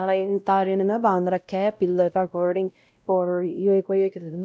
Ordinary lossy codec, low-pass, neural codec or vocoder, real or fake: none; none; codec, 16 kHz, 0.5 kbps, X-Codec, WavLM features, trained on Multilingual LibriSpeech; fake